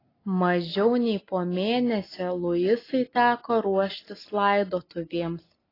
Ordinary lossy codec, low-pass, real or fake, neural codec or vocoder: AAC, 24 kbps; 5.4 kHz; real; none